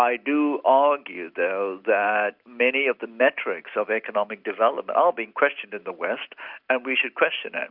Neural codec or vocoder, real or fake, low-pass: none; real; 5.4 kHz